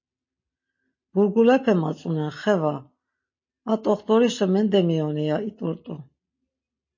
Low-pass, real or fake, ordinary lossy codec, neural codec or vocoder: 7.2 kHz; real; MP3, 32 kbps; none